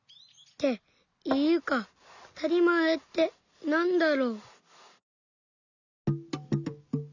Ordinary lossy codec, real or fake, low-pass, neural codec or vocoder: none; real; 7.2 kHz; none